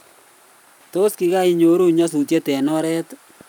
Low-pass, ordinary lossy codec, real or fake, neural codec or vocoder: 19.8 kHz; none; fake; vocoder, 44.1 kHz, 128 mel bands every 512 samples, BigVGAN v2